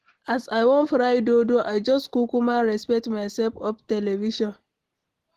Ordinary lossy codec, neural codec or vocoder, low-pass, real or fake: Opus, 16 kbps; none; 14.4 kHz; real